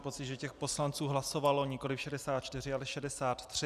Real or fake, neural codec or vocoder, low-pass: fake; vocoder, 44.1 kHz, 128 mel bands every 256 samples, BigVGAN v2; 14.4 kHz